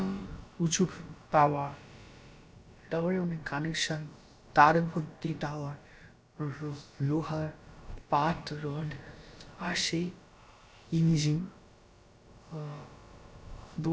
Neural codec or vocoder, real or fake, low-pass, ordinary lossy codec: codec, 16 kHz, about 1 kbps, DyCAST, with the encoder's durations; fake; none; none